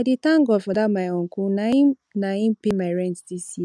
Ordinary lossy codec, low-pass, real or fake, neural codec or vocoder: none; none; real; none